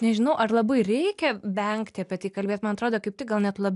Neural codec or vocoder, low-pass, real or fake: none; 10.8 kHz; real